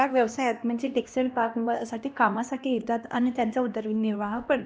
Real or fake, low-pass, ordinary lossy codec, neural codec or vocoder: fake; none; none; codec, 16 kHz, 1 kbps, X-Codec, HuBERT features, trained on LibriSpeech